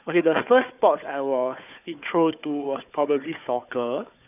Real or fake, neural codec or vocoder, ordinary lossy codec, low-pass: fake; codec, 16 kHz, 4 kbps, FunCodec, trained on Chinese and English, 50 frames a second; none; 3.6 kHz